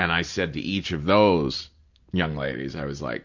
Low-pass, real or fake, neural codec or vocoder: 7.2 kHz; real; none